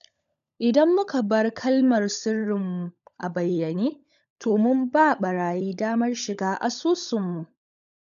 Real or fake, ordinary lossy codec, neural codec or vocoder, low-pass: fake; none; codec, 16 kHz, 16 kbps, FunCodec, trained on LibriTTS, 50 frames a second; 7.2 kHz